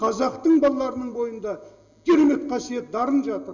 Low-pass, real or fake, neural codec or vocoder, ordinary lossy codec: 7.2 kHz; real; none; Opus, 64 kbps